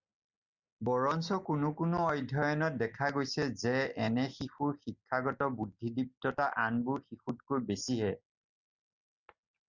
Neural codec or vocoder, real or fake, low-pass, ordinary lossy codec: none; real; 7.2 kHz; Opus, 64 kbps